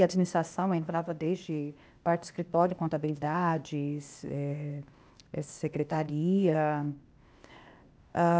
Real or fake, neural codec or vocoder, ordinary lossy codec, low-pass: fake; codec, 16 kHz, 0.8 kbps, ZipCodec; none; none